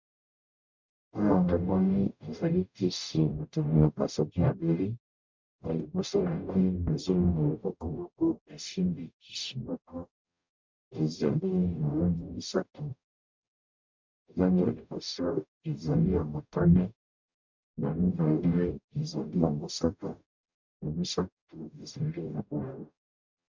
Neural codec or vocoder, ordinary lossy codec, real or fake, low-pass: codec, 44.1 kHz, 0.9 kbps, DAC; MP3, 64 kbps; fake; 7.2 kHz